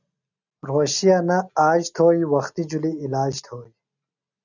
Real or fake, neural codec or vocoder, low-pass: real; none; 7.2 kHz